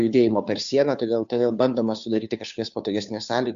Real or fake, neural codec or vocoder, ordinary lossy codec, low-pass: fake; codec, 16 kHz, 2 kbps, FunCodec, trained on Chinese and English, 25 frames a second; MP3, 64 kbps; 7.2 kHz